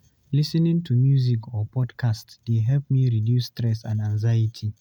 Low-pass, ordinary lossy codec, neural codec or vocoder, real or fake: 19.8 kHz; none; none; real